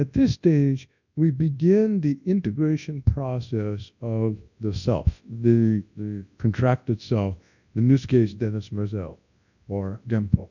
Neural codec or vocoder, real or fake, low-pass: codec, 24 kHz, 0.9 kbps, WavTokenizer, large speech release; fake; 7.2 kHz